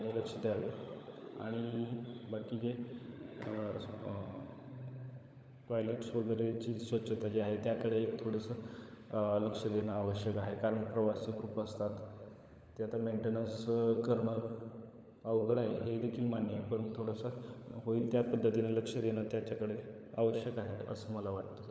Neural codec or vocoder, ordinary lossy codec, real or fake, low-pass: codec, 16 kHz, 16 kbps, FunCodec, trained on LibriTTS, 50 frames a second; none; fake; none